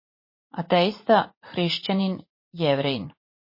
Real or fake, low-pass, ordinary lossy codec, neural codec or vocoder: fake; 5.4 kHz; MP3, 24 kbps; vocoder, 44.1 kHz, 80 mel bands, Vocos